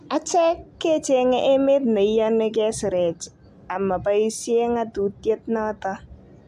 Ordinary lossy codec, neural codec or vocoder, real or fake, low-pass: AAC, 96 kbps; none; real; 14.4 kHz